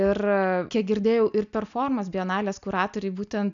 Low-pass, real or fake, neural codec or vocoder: 7.2 kHz; real; none